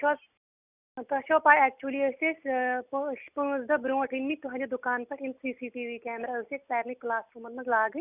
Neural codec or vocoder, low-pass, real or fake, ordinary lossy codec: none; 3.6 kHz; real; none